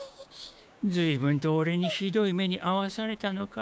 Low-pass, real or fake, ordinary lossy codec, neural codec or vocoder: none; fake; none; codec, 16 kHz, 6 kbps, DAC